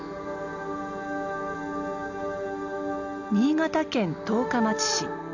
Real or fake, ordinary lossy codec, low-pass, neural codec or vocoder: real; none; 7.2 kHz; none